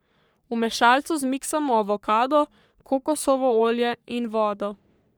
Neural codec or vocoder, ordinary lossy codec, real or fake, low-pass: codec, 44.1 kHz, 3.4 kbps, Pupu-Codec; none; fake; none